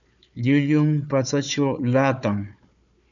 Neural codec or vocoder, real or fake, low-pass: codec, 16 kHz, 4 kbps, FunCodec, trained on Chinese and English, 50 frames a second; fake; 7.2 kHz